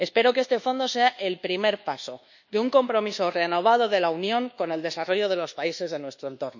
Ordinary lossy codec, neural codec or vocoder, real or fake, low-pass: none; codec, 24 kHz, 1.2 kbps, DualCodec; fake; 7.2 kHz